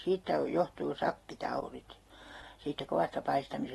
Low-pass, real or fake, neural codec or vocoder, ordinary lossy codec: 19.8 kHz; real; none; AAC, 32 kbps